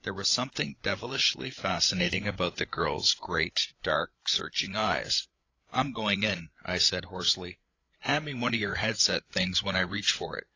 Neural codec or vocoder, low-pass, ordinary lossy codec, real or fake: codec, 16 kHz, 8 kbps, FreqCodec, larger model; 7.2 kHz; AAC, 32 kbps; fake